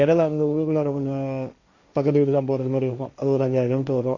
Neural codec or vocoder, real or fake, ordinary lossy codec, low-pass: codec, 16 kHz, 1.1 kbps, Voila-Tokenizer; fake; none; 7.2 kHz